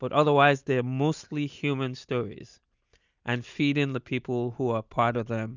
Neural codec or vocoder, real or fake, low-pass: none; real; 7.2 kHz